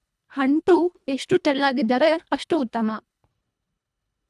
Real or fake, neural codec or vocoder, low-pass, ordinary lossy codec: fake; codec, 24 kHz, 1.5 kbps, HILCodec; none; none